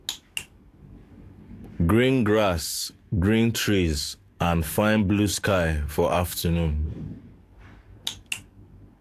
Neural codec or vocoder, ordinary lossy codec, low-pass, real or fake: codec, 44.1 kHz, 7.8 kbps, DAC; AAC, 64 kbps; 14.4 kHz; fake